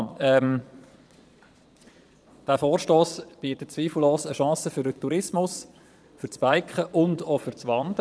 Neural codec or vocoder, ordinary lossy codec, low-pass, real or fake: vocoder, 22.05 kHz, 80 mel bands, Vocos; none; none; fake